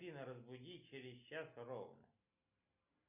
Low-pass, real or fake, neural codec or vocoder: 3.6 kHz; real; none